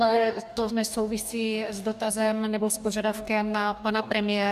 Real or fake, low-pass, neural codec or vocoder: fake; 14.4 kHz; codec, 44.1 kHz, 2.6 kbps, DAC